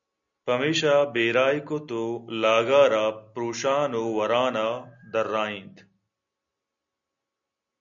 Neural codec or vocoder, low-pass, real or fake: none; 7.2 kHz; real